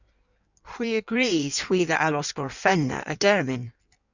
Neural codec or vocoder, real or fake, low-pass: codec, 16 kHz in and 24 kHz out, 1.1 kbps, FireRedTTS-2 codec; fake; 7.2 kHz